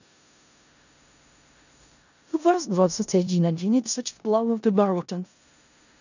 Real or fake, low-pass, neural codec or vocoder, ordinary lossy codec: fake; 7.2 kHz; codec, 16 kHz in and 24 kHz out, 0.4 kbps, LongCat-Audio-Codec, four codebook decoder; none